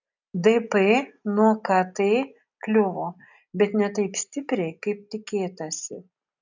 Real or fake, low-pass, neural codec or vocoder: real; 7.2 kHz; none